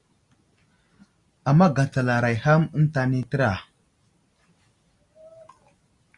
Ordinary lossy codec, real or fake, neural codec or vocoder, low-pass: Opus, 64 kbps; real; none; 10.8 kHz